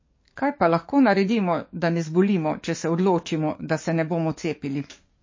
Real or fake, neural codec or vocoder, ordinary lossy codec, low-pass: fake; codec, 44.1 kHz, 7.8 kbps, DAC; MP3, 32 kbps; 7.2 kHz